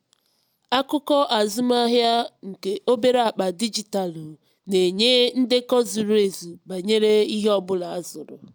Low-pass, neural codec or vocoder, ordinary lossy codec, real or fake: none; none; none; real